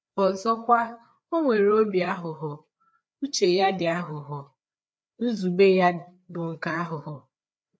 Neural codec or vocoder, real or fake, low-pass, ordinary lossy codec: codec, 16 kHz, 4 kbps, FreqCodec, larger model; fake; none; none